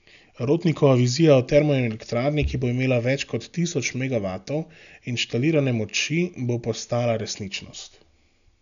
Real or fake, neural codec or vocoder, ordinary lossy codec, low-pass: real; none; none; 7.2 kHz